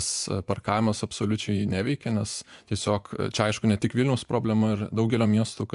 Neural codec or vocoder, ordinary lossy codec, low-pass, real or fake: vocoder, 24 kHz, 100 mel bands, Vocos; AAC, 96 kbps; 10.8 kHz; fake